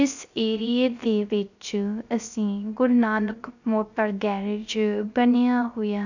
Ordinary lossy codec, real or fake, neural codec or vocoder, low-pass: none; fake; codec, 16 kHz, 0.3 kbps, FocalCodec; 7.2 kHz